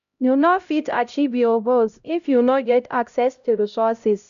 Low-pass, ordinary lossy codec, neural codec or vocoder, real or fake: 7.2 kHz; MP3, 96 kbps; codec, 16 kHz, 0.5 kbps, X-Codec, HuBERT features, trained on LibriSpeech; fake